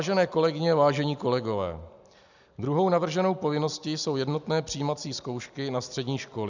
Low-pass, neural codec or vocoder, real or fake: 7.2 kHz; none; real